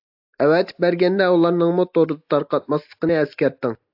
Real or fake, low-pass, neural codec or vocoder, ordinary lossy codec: real; 5.4 kHz; none; AAC, 48 kbps